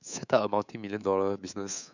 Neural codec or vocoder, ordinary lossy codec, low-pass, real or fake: codec, 24 kHz, 3.1 kbps, DualCodec; none; 7.2 kHz; fake